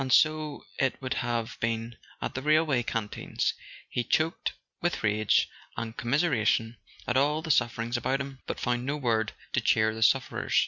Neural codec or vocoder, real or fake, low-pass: none; real; 7.2 kHz